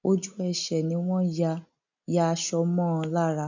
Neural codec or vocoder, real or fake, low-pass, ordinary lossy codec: none; real; 7.2 kHz; none